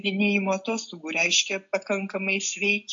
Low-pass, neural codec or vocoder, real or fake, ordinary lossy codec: 7.2 kHz; none; real; AAC, 64 kbps